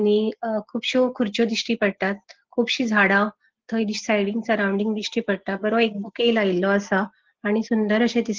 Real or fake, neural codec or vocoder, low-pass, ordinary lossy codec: real; none; 7.2 kHz; Opus, 16 kbps